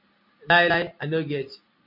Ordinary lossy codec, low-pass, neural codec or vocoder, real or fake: MP3, 48 kbps; 5.4 kHz; none; real